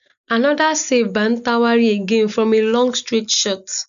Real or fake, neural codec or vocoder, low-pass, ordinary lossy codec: real; none; 7.2 kHz; none